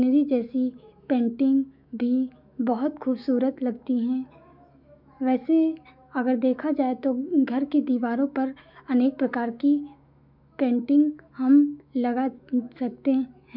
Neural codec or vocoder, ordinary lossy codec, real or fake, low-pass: autoencoder, 48 kHz, 128 numbers a frame, DAC-VAE, trained on Japanese speech; none; fake; 5.4 kHz